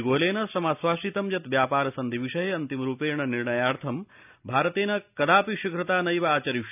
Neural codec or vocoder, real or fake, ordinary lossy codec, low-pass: none; real; none; 3.6 kHz